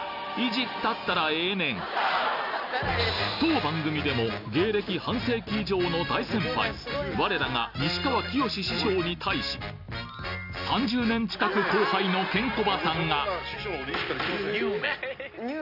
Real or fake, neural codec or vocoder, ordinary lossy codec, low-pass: real; none; none; 5.4 kHz